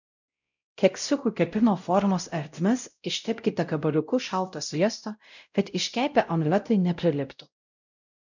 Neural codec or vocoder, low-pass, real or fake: codec, 16 kHz, 0.5 kbps, X-Codec, WavLM features, trained on Multilingual LibriSpeech; 7.2 kHz; fake